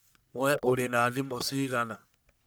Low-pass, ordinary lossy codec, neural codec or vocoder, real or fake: none; none; codec, 44.1 kHz, 1.7 kbps, Pupu-Codec; fake